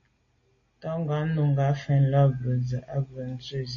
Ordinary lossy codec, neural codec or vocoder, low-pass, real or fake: MP3, 32 kbps; none; 7.2 kHz; real